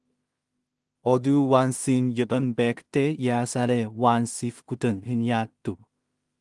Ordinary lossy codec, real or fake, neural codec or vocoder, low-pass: Opus, 24 kbps; fake; codec, 16 kHz in and 24 kHz out, 0.4 kbps, LongCat-Audio-Codec, two codebook decoder; 10.8 kHz